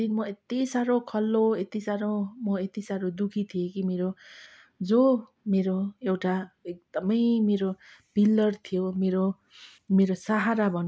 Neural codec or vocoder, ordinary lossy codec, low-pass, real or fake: none; none; none; real